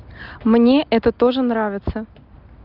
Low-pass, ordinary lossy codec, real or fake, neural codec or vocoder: 5.4 kHz; Opus, 32 kbps; real; none